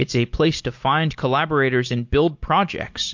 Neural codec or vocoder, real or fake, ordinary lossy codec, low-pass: none; real; MP3, 48 kbps; 7.2 kHz